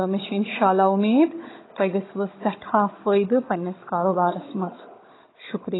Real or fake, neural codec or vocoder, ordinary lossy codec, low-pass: fake; codec, 16 kHz, 16 kbps, FunCodec, trained on Chinese and English, 50 frames a second; AAC, 16 kbps; 7.2 kHz